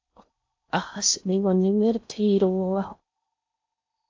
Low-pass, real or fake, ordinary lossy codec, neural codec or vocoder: 7.2 kHz; fake; AAC, 48 kbps; codec, 16 kHz in and 24 kHz out, 0.6 kbps, FocalCodec, streaming, 4096 codes